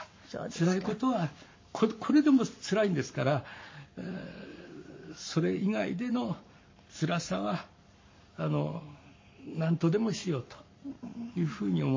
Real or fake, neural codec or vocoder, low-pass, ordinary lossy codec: fake; codec, 44.1 kHz, 7.8 kbps, Pupu-Codec; 7.2 kHz; MP3, 32 kbps